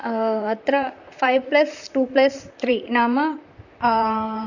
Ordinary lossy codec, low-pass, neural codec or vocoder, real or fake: none; 7.2 kHz; vocoder, 44.1 kHz, 128 mel bands, Pupu-Vocoder; fake